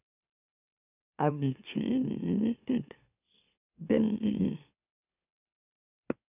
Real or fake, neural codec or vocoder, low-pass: fake; autoencoder, 44.1 kHz, a latent of 192 numbers a frame, MeloTTS; 3.6 kHz